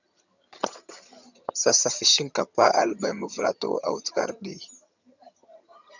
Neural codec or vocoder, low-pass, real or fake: vocoder, 22.05 kHz, 80 mel bands, HiFi-GAN; 7.2 kHz; fake